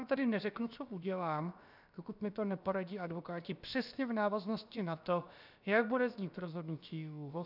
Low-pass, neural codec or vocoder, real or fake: 5.4 kHz; codec, 16 kHz, about 1 kbps, DyCAST, with the encoder's durations; fake